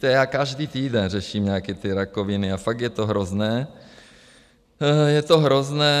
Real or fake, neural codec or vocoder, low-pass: real; none; 14.4 kHz